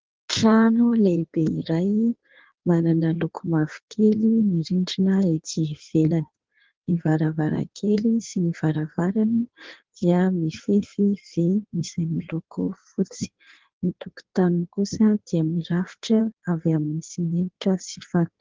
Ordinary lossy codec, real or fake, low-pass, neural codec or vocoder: Opus, 16 kbps; fake; 7.2 kHz; codec, 16 kHz in and 24 kHz out, 1.1 kbps, FireRedTTS-2 codec